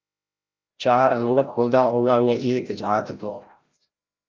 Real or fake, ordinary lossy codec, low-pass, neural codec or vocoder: fake; Opus, 24 kbps; 7.2 kHz; codec, 16 kHz, 0.5 kbps, FreqCodec, larger model